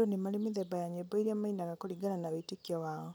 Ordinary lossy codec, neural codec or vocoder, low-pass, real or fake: none; none; none; real